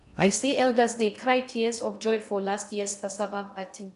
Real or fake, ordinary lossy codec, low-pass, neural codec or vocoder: fake; none; 10.8 kHz; codec, 16 kHz in and 24 kHz out, 0.6 kbps, FocalCodec, streaming, 2048 codes